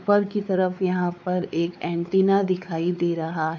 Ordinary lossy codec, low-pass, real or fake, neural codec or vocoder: none; none; fake; codec, 16 kHz, 4 kbps, X-Codec, WavLM features, trained on Multilingual LibriSpeech